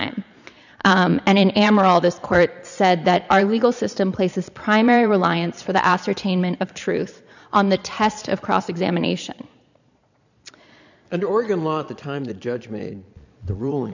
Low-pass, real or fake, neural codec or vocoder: 7.2 kHz; fake; vocoder, 22.05 kHz, 80 mel bands, Vocos